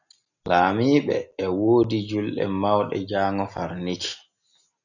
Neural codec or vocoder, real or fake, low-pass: none; real; 7.2 kHz